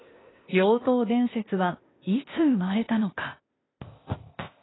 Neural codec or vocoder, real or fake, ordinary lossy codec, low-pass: codec, 16 kHz, 0.8 kbps, ZipCodec; fake; AAC, 16 kbps; 7.2 kHz